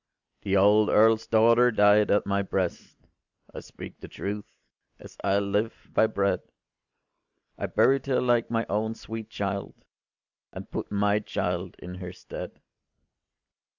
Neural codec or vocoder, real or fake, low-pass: none; real; 7.2 kHz